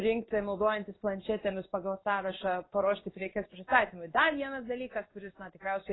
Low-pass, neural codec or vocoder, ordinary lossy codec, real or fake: 7.2 kHz; codec, 16 kHz in and 24 kHz out, 1 kbps, XY-Tokenizer; AAC, 16 kbps; fake